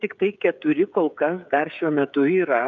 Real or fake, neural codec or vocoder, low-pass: fake; codec, 16 kHz, 16 kbps, FreqCodec, smaller model; 7.2 kHz